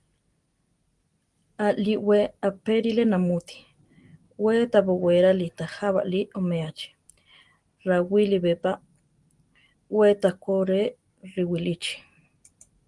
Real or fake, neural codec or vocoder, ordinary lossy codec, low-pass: real; none; Opus, 24 kbps; 10.8 kHz